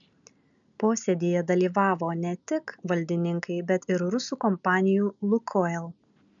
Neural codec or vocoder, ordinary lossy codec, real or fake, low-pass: none; MP3, 96 kbps; real; 7.2 kHz